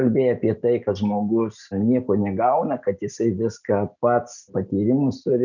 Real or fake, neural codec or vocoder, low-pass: real; none; 7.2 kHz